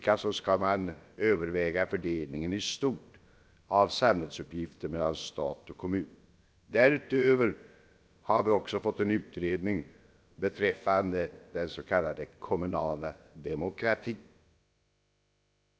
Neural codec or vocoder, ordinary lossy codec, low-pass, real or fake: codec, 16 kHz, about 1 kbps, DyCAST, with the encoder's durations; none; none; fake